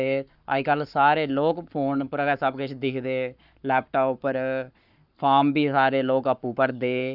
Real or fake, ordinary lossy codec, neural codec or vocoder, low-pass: fake; none; codec, 44.1 kHz, 7.8 kbps, Pupu-Codec; 5.4 kHz